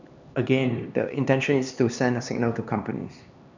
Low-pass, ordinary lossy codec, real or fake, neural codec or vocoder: 7.2 kHz; none; fake; codec, 16 kHz, 2 kbps, X-Codec, HuBERT features, trained on LibriSpeech